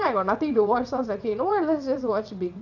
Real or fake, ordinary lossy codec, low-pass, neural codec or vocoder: fake; none; 7.2 kHz; vocoder, 22.05 kHz, 80 mel bands, WaveNeXt